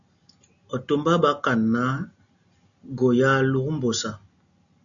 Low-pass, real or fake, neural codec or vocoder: 7.2 kHz; real; none